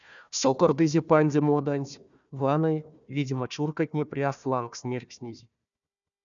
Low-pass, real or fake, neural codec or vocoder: 7.2 kHz; fake; codec, 16 kHz, 1 kbps, FunCodec, trained on Chinese and English, 50 frames a second